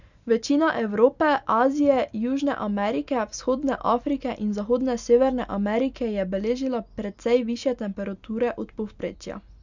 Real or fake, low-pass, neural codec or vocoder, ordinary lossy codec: real; 7.2 kHz; none; none